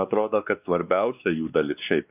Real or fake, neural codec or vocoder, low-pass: fake; codec, 16 kHz, 2 kbps, X-Codec, WavLM features, trained on Multilingual LibriSpeech; 3.6 kHz